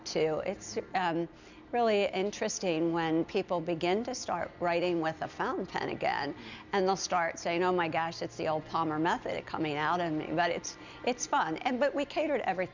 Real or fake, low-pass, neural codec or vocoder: real; 7.2 kHz; none